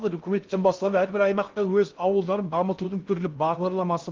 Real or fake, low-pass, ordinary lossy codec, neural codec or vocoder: fake; 7.2 kHz; Opus, 24 kbps; codec, 16 kHz in and 24 kHz out, 0.6 kbps, FocalCodec, streaming, 2048 codes